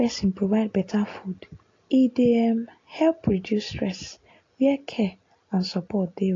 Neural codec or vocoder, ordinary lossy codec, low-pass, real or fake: none; AAC, 32 kbps; 7.2 kHz; real